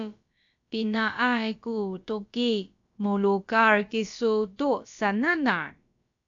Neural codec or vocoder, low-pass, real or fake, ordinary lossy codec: codec, 16 kHz, about 1 kbps, DyCAST, with the encoder's durations; 7.2 kHz; fake; AAC, 64 kbps